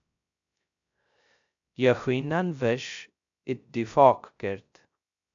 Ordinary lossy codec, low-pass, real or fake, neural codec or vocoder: AAC, 64 kbps; 7.2 kHz; fake; codec, 16 kHz, 0.3 kbps, FocalCodec